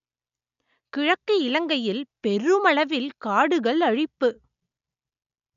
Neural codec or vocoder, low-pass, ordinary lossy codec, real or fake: none; 7.2 kHz; none; real